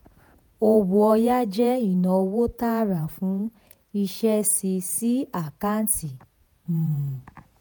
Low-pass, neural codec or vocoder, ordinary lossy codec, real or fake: none; vocoder, 48 kHz, 128 mel bands, Vocos; none; fake